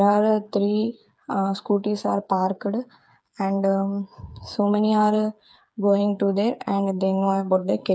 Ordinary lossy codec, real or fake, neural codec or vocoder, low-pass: none; fake; codec, 16 kHz, 8 kbps, FreqCodec, smaller model; none